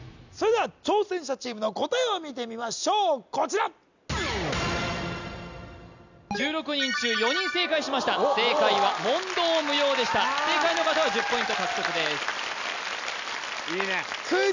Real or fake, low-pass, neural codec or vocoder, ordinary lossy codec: real; 7.2 kHz; none; none